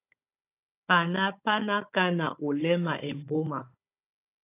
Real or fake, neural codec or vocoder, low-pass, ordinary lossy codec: fake; codec, 16 kHz, 16 kbps, FunCodec, trained on Chinese and English, 50 frames a second; 3.6 kHz; AAC, 24 kbps